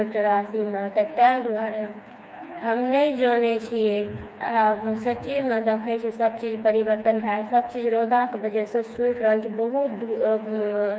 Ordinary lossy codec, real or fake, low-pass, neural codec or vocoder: none; fake; none; codec, 16 kHz, 2 kbps, FreqCodec, smaller model